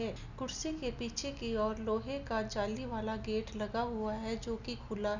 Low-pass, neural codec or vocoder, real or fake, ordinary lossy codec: 7.2 kHz; none; real; none